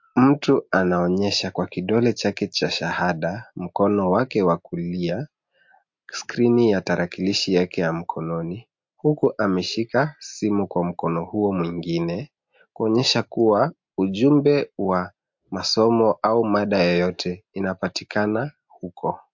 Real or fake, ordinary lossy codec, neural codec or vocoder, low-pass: real; MP3, 48 kbps; none; 7.2 kHz